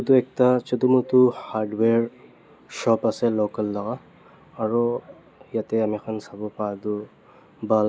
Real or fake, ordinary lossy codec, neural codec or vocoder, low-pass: real; none; none; none